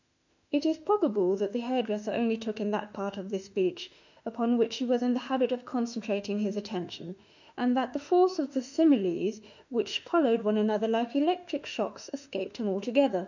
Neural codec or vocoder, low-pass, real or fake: autoencoder, 48 kHz, 32 numbers a frame, DAC-VAE, trained on Japanese speech; 7.2 kHz; fake